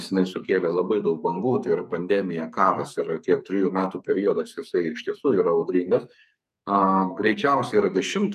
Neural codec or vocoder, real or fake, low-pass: codec, 44.1 kHz, 2.6 kbps, SNAC; fake; 14.4 kHz